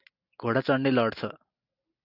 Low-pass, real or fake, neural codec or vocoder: 5.4 kHz; real; none